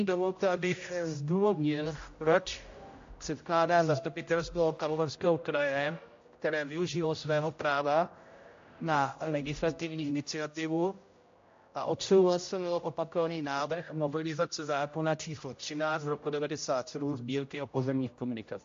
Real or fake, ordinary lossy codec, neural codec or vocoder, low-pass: fake; AAC, 48 kbps; codec, 16 kHz, 0.5 kbps, X-Codec, HuBERT features, trained on general audio; 7.2 kHz